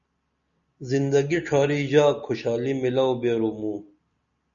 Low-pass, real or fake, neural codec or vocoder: 7.2 kHz; real; none